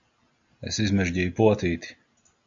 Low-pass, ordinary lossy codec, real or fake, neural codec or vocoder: 7.2 kHz; AAC, 64 kbps; real; none